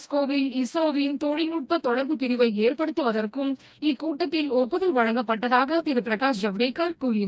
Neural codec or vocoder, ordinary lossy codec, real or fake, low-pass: codec, 16 kHz, 1 kbps, FreqCodec, smaller model; none; fake; none